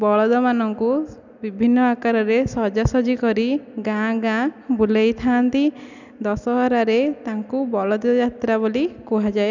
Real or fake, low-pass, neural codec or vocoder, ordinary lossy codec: real; 7.2 kHz; none; none